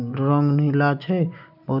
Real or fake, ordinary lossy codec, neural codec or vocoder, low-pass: real; none; none; 5.4 kHz